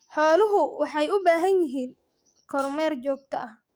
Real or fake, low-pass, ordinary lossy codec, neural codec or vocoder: fake; none; none; codec, 44.1 kHz, 7.8 kbps, DAC